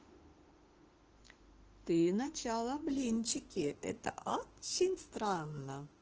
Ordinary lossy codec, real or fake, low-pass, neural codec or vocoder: Opus, 16 kbps; fake; 7.2 kHz; autoencoder, 48 kHz, 32 numbers a frame, DAC-VAE, trained on Japanese speech